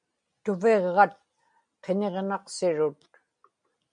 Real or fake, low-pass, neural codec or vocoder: real; 9.9 kHz; none